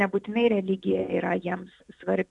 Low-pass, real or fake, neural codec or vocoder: 10.8 kHz; real; none